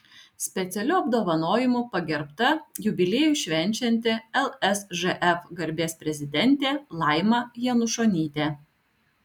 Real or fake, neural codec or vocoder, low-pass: real; none; 19.8 kHz